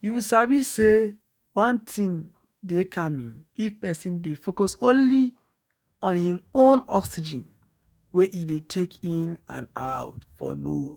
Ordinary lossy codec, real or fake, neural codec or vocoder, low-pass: none; fake; codec, 44.1 kHz, 2.6 kbps, DAC; 19.8 kHz